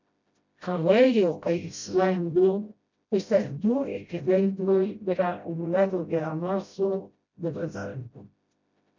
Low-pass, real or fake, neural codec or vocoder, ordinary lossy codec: 7.2 kHz; fake; codec, 16 kHz, 0.5 kbps, FreqCodec, smaller model; MP3, 64 kbps